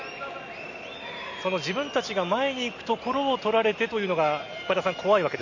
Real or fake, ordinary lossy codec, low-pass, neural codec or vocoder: real; none; 7.2 kHz; none